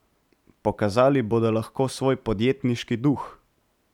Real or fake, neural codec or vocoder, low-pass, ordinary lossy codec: real; none; 19.8 kHz; none